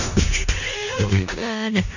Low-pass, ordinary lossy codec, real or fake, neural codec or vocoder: 7.2 kHz; none; fake; codec, 16 kHz in and 24 kHz out, 0.4 kbps, LongCat-Audio-Codec, four codebook decoder